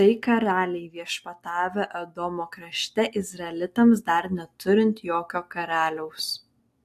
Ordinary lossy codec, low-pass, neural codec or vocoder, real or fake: AAC, 64 kbps; 14.4 kHz; none; real